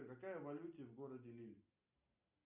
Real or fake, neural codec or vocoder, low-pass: real; none; 3.6 kHz